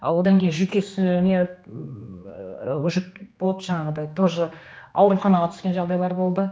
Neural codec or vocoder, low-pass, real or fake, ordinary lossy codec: codec, 16 kHz, 2 kbps, X-Codec, HuBERT features, trained on general audio; none; fake; none